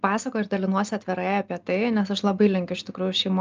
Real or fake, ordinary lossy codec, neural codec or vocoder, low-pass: real; Opus, 24 kbps; none; 7.2 kHz